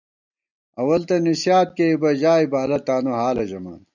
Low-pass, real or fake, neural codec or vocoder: 7.2 kHz; real; none